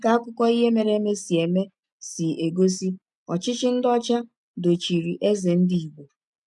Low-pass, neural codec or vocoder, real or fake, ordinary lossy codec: 10.8 kHz; none; real; none